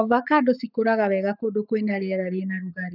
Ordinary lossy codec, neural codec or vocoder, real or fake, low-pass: none; codec, 44.1 kHz, 7.8 kbps, Pupu-Codec; fake; 5.4 kHz